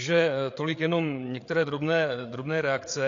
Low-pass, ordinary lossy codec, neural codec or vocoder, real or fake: 7.2 kHz; AAC, 48 kbps; codec, 16 kHz, 8 kbps, FreqCodec, larger model; fake